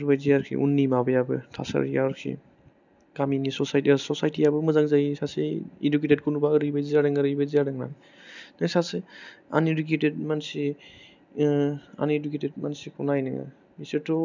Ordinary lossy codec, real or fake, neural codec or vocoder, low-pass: none; real; none; 7.2 kHz